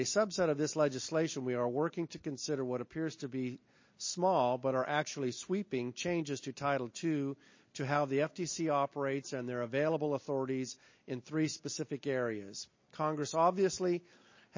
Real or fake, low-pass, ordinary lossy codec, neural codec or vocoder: real; 7.2 kHz; MP3, 32 kbps; none